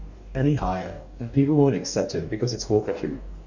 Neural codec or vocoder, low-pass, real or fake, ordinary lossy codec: codec, 44.1 kHz, 2.6 kbps, DAC; 7.2 kHz; fake; none